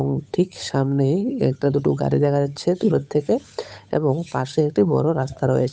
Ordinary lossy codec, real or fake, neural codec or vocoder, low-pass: none; fake; codec, 16 kHz, 8 kbps, FunCodec, trained on Chinese and English, 25 frames a second; none